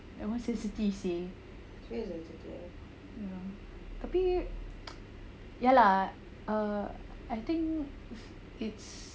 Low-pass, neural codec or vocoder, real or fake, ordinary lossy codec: none; none; real; none